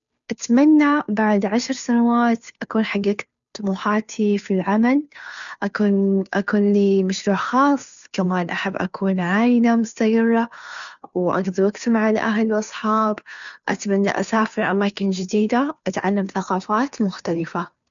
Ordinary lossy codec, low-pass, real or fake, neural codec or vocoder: none; 7.2 kHz; fake; codec, 16 kHz, 2 kbps, FunCodec, trained on Chinese and English, 25 frames a second